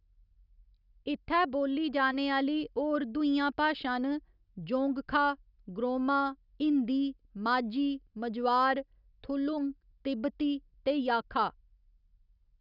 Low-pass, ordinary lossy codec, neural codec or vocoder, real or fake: 5.4 kHz; none; none; real